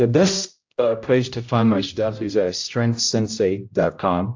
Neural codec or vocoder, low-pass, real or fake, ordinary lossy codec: codec, 16 kHz, 0.5 kbps, X-Codec, HuBERT features, trained on general audio; 7.2 kHz; fake; AAC, 48 kbps